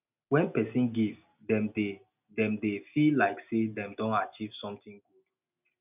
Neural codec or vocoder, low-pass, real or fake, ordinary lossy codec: none; 3.6 kHz; real; none